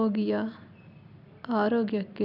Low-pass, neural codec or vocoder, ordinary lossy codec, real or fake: 5.4 kHz; none; none; real